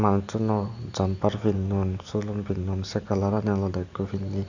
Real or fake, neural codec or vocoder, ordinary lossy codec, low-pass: real; none; Opus, 64 kbps; 7.2 kHz